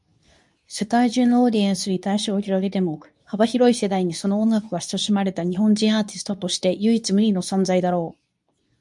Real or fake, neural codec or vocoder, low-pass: fake; codec, 24 kHz, 0.9 kbps, WavTokenizer, medium speech release version 2; 10.8 kHz